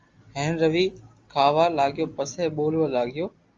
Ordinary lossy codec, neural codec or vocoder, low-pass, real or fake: Opus, 32 kbps; none; 7.2 kHz; real